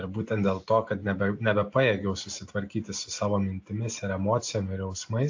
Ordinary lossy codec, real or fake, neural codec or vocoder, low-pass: AAC, 48 kbps; real; none; 7.2 kHz